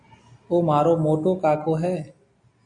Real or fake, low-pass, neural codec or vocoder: real; 9.9 kHz; none